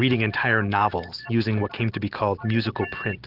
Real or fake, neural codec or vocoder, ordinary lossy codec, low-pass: real; none; Opus, 16 kbps; 5.4 kHz